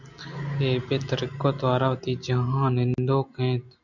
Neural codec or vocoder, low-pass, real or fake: none; 7.2 kHz; real